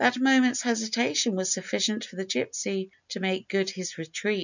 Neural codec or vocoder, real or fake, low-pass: none; real; 7.2 kHz